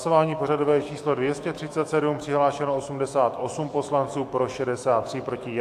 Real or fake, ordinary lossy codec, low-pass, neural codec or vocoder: fake; AAC, 64 kbps; 14.4 kHz; autoencoder, 48 kHz, 128 numbers a frame, DAC-VAE, trained on Japanese speech